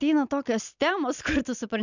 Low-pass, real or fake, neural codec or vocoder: 7.2 kHz; real; none